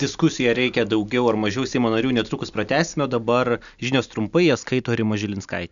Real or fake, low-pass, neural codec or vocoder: real; 7.2 kHz; none